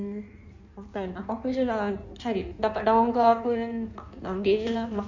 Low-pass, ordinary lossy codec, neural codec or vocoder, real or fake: 7.2 kHz; none; codec, 16 kHz in and 24 kHz out, 1.1 kbps, FireRedTTS-2 codec; fake